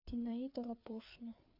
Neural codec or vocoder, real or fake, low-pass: codec, 16 kHz, 4 kbps, FreqCodec, larger model; fake; 5.4 kHz